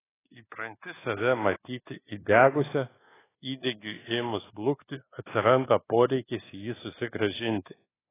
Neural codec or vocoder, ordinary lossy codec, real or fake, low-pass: codec, 24 kHz, 1.2 kbps, DualCodec; AAC, 16 kbps; fake; 3.6 kHz